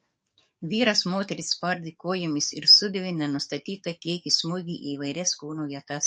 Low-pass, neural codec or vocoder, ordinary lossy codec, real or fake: 10.8 kHz; codec, 44.1 kHz, 7.8 kbps, DAC; MP3, 48 kbps; fake